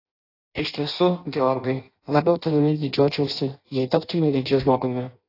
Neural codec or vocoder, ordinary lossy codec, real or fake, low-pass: codec, 16 kHz in and 24 kHz out, 0.6 kbps, FireRedTTS-2 codec; AAC, 32 kbps; fake; 5.4 kHz